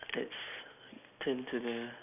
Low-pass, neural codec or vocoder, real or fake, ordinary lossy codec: 3.6 kHz; none; real; none